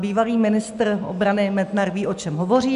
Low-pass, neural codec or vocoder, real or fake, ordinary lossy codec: 10.8 kHz; none; real; MP3, 96 kbps